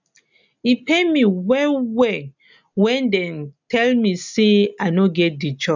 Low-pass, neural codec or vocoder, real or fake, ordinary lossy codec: 7.2 kHz; none; real; none